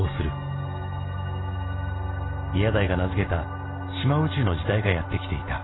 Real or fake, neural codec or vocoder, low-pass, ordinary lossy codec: real; none; 7.2 kHz; AAC, 16 kbps